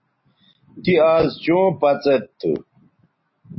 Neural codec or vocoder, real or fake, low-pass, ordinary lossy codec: none; real; 7.2 kHz; MP3, 24 kbps